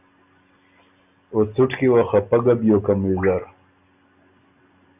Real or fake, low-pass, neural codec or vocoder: real; 3.6 kHz; none